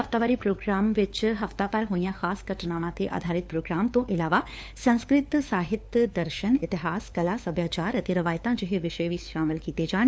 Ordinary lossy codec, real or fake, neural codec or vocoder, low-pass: none; fake; codec, 16 kHz, 4 kbps, FunCodec, trained on LibriTTS, 50 frames a second; none